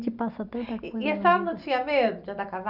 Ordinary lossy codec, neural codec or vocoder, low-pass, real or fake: none; none; 5.4 kHz; real